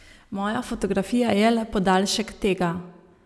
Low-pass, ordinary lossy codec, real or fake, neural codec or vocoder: none; none; real; none